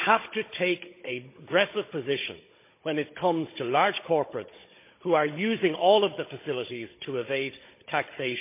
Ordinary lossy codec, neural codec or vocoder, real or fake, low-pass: MP3, 24 kbps; codec, 16 kHz, 16 kbps, FunCodec, trained on Chinese and English, 50 frames a second; fake; 3.6 kHz